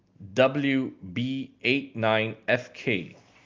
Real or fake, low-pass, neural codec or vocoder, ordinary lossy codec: real; 7.2 kHz; none; Opus, 24 kbps